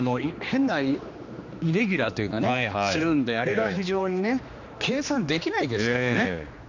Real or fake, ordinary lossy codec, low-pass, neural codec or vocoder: fake; none; 7.2 kHz; codec, 16 kHz, 2 kbps, X-Codec, HuBERT features, trained on general audio